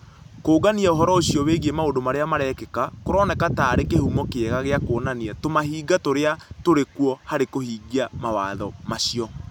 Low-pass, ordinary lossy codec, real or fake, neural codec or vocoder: 19.8 kHz; none; real; none